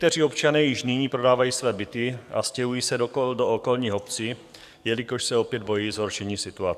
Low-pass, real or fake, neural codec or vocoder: 14.4 kHz; fake; codec, 44.1 kHz, 7.8 kbps, DAC